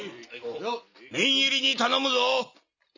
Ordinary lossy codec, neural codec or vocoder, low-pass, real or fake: none; none; 7.2 kHz; real